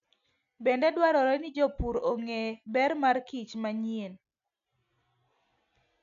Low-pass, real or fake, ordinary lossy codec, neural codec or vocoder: 7.2 kHz; real; none; none